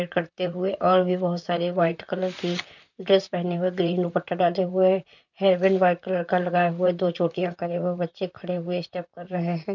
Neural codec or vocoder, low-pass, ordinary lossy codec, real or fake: vocoder, 44.1 kHz, 128 mel bands, Pupu-Vocoder; 7.2 kHz; none; fake